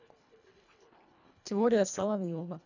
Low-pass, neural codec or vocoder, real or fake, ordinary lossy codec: 7.2 kHz; codec, 24 kHz, 1.5 kbps, HILCodec; fake; none